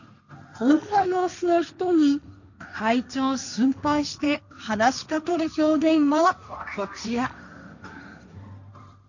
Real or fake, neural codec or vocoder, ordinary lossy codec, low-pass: fake; codec, 16 kHz, 1.1 kbps, Voila-Tokenizer; none; 7.2 kHz